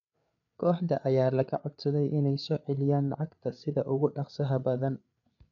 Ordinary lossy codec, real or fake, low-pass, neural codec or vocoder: MP3, 96 kbps; fake; 7.2 kHz; codec, 16 kHz, 8 kbps, FreqCodec, larger model